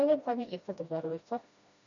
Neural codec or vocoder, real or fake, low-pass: codec, 16 kHz, 1 kbps, FreqCodec, smaller model; fake; 7.2 kHz